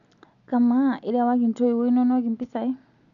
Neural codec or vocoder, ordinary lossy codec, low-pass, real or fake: none; none; 7.2 kHz; real